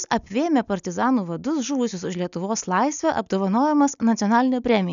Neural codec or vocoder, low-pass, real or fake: none; 7.2 kHz; real